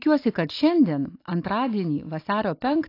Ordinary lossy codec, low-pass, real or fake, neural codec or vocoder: AAC, 32 kbps; 5.4 kHz; real; none